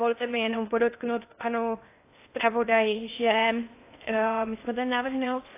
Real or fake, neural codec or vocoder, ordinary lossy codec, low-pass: fake; codec, 16 kHz in and 24 kHz out, 0.6 kbps, FocalCodec, streaming, 2048 codes; AAC, 24 kbps; 3.6 kHz